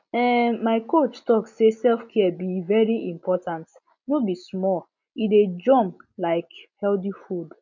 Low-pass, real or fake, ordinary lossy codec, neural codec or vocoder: 7.2 kHz; real; none; none